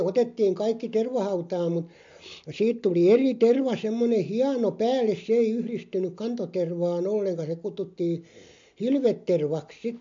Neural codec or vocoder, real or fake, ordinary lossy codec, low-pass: none; real; MP3, 48 kbps; 7.2 kHz